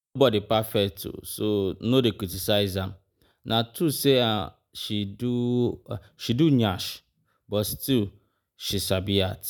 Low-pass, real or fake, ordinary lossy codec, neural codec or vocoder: none; real; none; none